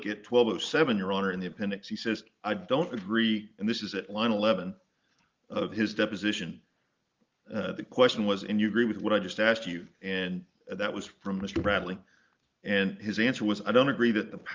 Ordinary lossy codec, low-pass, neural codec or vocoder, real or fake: Opus, 32 kbps; 7.2 kHz; none; real